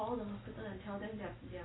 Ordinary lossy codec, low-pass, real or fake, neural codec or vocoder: AAC, 16 kbps; 7.2 kHz; real; none